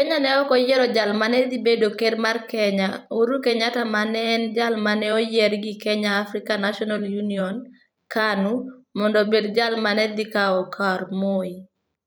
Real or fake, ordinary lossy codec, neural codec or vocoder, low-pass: fake; none; vocoder, 44.1 kHz, 128 mel bands every 512 samples, BigVGAN v2; none